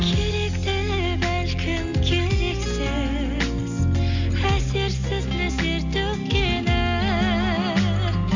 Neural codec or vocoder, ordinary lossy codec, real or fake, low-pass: none; Opus, 64 kbps; real; 7.2 kHz